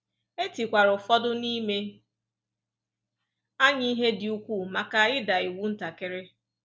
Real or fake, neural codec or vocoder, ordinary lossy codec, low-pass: real; none; none; none